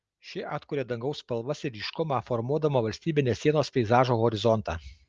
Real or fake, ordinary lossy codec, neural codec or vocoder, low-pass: real; Opus, 24 kbps; none; 7.2 kHz